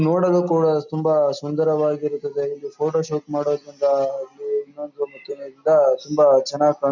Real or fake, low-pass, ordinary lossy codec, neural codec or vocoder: real; 7.2 kHz; none; none